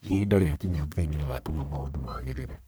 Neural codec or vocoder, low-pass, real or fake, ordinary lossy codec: codec, 44.1 kHz, 1.7 kbps, Pupu-Codec; none; fake; none